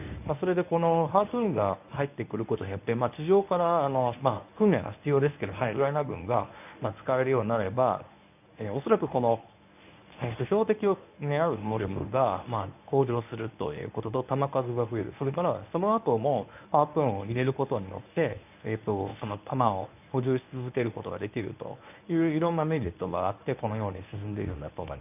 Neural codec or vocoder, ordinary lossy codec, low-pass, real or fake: codec, 24 kHz, 0.9 kbps, WavTokenizer, medium speech release version 1; none; 3.6 kHz; fake